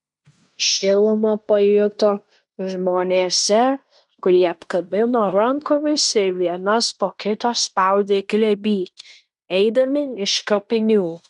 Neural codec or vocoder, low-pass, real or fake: codec, 16 kHz in and 24 kHz out, 0.9 kbps, LongCat-Audio-Codec, fine tuned four codebook decoder; 10.8 kHz; fake